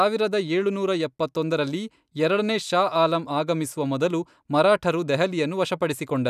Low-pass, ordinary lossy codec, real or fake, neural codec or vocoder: 14.4 kHz; none; real; none